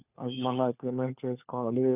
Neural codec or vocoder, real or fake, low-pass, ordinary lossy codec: codec, 16 kHz, 4 kbps, FunCodec, trained on LibriTTS, 50 frames a second; fake; 3.6 kHz; none